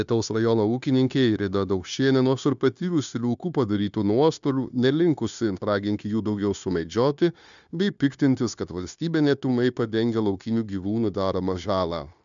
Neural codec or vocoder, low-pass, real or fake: codec, 16 kHz, 0.9 kbps, LongCat-Audio-Codec; 7.2 kHz; fake